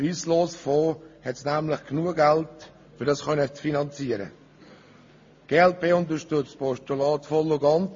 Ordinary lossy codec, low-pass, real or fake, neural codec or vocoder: MP3, 32 kbps; 7.2 kHz; real; none